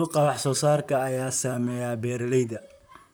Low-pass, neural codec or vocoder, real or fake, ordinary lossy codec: none; vocoder, 44.1 kHz, 128 mel bands, Pupu-Vocoder; fake; none